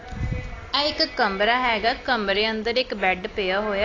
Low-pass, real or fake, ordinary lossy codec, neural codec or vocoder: 7.2 kHz; real; AAC, 32 kbps; none